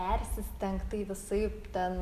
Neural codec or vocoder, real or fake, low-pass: none; real; 14.4 kHz